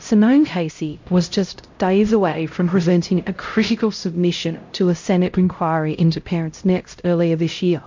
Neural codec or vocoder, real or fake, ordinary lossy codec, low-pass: codec, 16 kHz, 0.5 kbps, X-Codec, HuBERT features, trained on LibriSpeech; fake; MP3, 48 kbps; 7.2 kHz